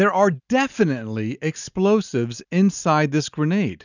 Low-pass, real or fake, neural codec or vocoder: 7.2 kHz; real; none